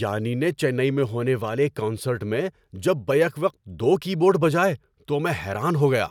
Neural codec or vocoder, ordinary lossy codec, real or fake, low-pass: none; none; real; 19.8 kHz